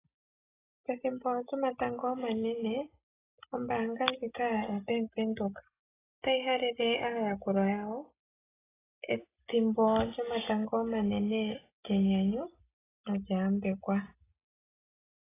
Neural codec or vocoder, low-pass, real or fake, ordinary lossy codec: none; 3.6 kHz; real; AAC, 16 kbps